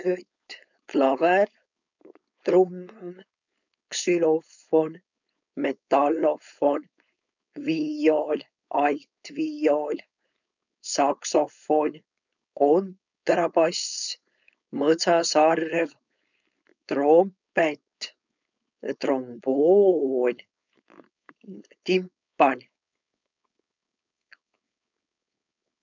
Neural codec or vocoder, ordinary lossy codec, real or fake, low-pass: codec, 16 kHz, 4.8 kbps, FACodec; none; fake; 7.2 kHz